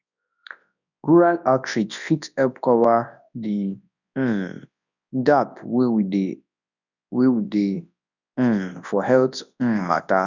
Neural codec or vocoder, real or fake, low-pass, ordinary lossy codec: codec, 24 kHz, 0.9 kbps, WavTokenizer, large speech release; fake; 7.2 kHz; none